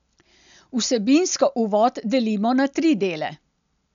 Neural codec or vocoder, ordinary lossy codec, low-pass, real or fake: none; none; 7.2 kHz; real